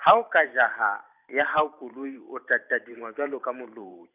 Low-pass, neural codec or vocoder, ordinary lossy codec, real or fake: 3.6 kHz; vocoder, 44.1 kHz, 128 mel bands every 512 samples, BigVGAN v2; none; fake